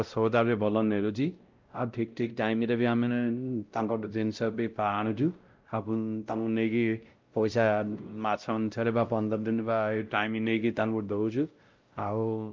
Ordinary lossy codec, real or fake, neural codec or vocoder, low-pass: Opus, 32 kbps; fake; codec, 16 kHz, 0.5 kbps, X-Codec, WavLM features, trained on Multilingual LibriSpeech; 7.2 kHz